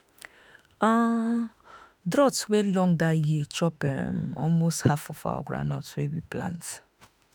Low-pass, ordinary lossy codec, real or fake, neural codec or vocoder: none; none; fake; autoencoder, 48 kHz, 32 numbers a frame, DAC-VAE, trained on Japanese speech